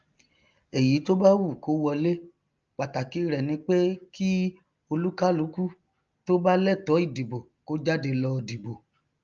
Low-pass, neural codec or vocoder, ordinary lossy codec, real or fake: 7.2 kHz; none; Opus, 24 kbps; real